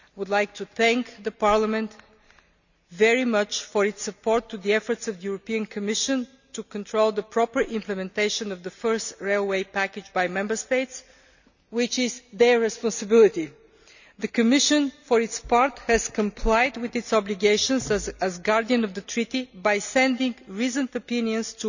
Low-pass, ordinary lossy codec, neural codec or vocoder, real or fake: 7.2 kHz; none; none; real